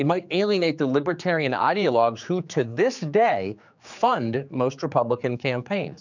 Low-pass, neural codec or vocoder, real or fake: 7.2 kHz; codec, 16 kHz, 4 kbps, X-Codec, HuBERT features, trained on general audio; fake